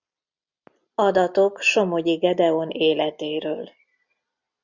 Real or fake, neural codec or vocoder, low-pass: real; none; 7.2 kHz